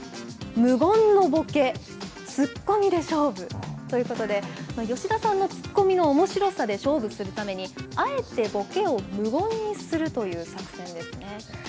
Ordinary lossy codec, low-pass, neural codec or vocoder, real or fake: none; none; none; real